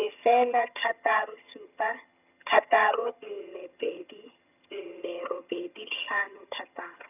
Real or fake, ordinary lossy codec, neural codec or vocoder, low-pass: fake; none; vocoder, 22.05 kHz, 80 mel bands, HiFi-GAN; 3.6 kHz